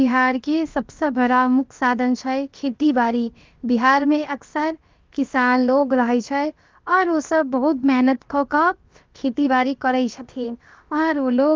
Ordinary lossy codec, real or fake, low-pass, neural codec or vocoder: Opus, 24 kbps; fake; 7.2 kHz; codec, 16 kHz, about 1 kbps, DyCAST, with the encoder's durations